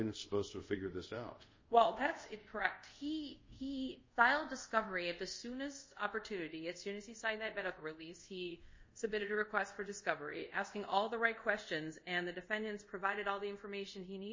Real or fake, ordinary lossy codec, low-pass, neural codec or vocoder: fake; MP3, 32 kbps; 7.2 kHz; codec, 24 kHz, 0.5 kbps, DualCodec